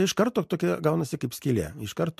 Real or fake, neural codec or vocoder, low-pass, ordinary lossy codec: real; none; 14.4 kHz; MP3, 64 kbps